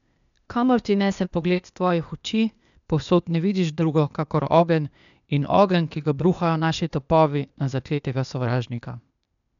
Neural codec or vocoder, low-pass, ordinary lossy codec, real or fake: codec, 16 kHz, 0.8 kbps, ZipCodec; 7.2 kHz; none; fake